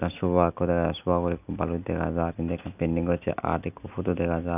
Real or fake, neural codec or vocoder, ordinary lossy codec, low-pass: real; none; none; 3.6 kHz